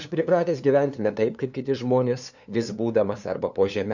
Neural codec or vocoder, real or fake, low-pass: codec, 16 kHz, 2 kbps, FunCodec, trained on LibriTTS, 25 frames a second; fake; 7.2 kHz